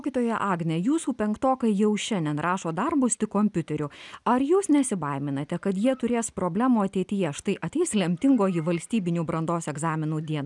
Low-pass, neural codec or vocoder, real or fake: 10.8 kHz; none; real